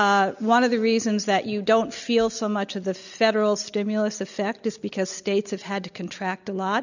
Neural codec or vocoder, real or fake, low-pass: none; real; 7.2 kHz